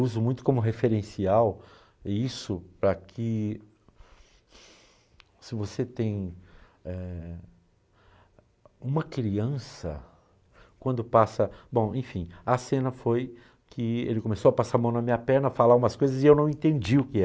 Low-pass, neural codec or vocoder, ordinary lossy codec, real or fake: none; none; none; real